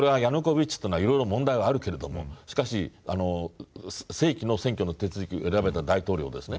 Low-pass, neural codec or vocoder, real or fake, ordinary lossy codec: none; none; real; none